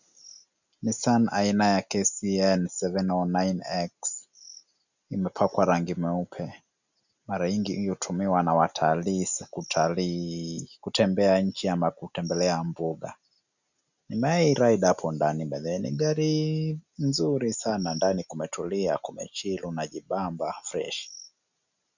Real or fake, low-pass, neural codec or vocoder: real; 7.2 kHz; none